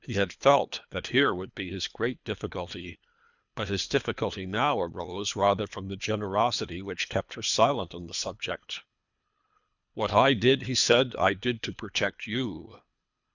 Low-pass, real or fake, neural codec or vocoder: 7.2 kHz; fake; codec, 24 kHz, 3 kbps, HILCodec